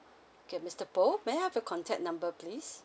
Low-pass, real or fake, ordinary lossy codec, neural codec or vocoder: none; real; none; none